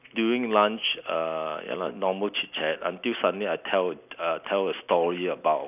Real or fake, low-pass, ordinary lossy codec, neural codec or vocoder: real; 3.6 kHz; none; none